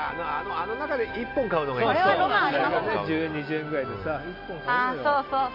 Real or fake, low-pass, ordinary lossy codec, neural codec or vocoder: real; 5.4 kHz; MP3, 48 kbps; none